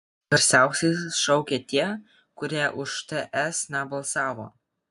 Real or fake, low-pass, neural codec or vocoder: real; 10.8 kHz; none